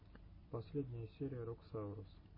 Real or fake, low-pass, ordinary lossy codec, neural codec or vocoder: real; 5.4 kHz; MP3, 24 kbps; none